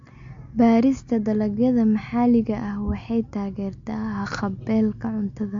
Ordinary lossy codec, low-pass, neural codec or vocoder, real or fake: MP3, 48 kbps; 7.2 kHz; none; real